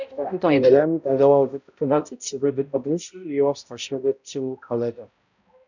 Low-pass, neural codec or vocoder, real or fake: 7.2 kHz; codec, 16 kHz, 0.5 kbps, X-Codec, HuBERT features, trained on balanced general audio; fake